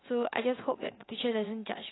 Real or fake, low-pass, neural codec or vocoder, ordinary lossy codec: fake; 7.2 kHz; vocoder, 44.1 kHz, 80 mel bands, Vocos; AAC, 16 kbps